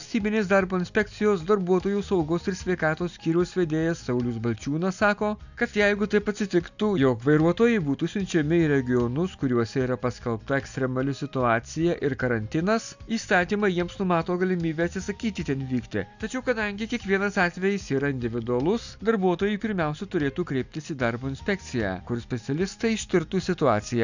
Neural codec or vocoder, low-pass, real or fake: none; 7.2 kHz; real